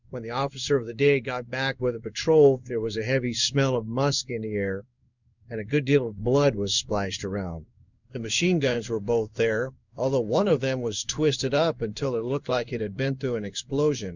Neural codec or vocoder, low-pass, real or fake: codec, 16 kHz in and 24 kHz out, 1 kbps, XY-Tokenizer; 7.2 kHz; fake